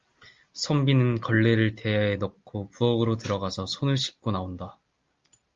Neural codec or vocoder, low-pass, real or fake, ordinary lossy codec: none; 7.2 kHz; real; Opus, 32 kbps